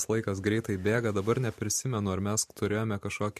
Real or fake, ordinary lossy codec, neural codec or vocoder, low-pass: fake; MP3, 64 kbps; vocoder, 44.1 kHz, 128 mel bands every 512 samples, BigVGAN v2; 14.4 kHz